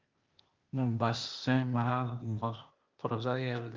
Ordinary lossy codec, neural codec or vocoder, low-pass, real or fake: Opus, 24 kbps; codec, 16 kHz, 0.8 kbps, ZipCodec; 7.2 kHz; fake